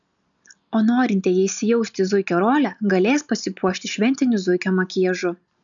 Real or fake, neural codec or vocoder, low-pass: real; none; 7.2 kHz